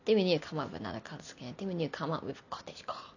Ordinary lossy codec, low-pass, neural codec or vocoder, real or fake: none; 7.2 kHz; none; real